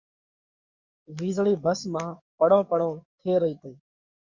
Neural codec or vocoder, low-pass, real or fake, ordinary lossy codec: codec, 44.1 kHz, 7.8 kbps, DAC; 7.2 kHz; fake; Opus, 64 kbps